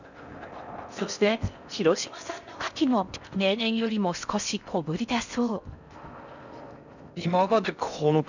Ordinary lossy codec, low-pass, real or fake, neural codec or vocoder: none; 7.2 kHz; fake; codec, 16 kHz in and 24 kHz out, 0.6 kbps, FocalCodec, streaming, 4096 codes